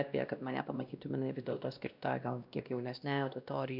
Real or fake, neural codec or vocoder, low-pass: fake; codec, 16 kHz, 1 kbps, X-Codec, WavLM features, trained on Multilingual LibriSpeech; 5.4 kHz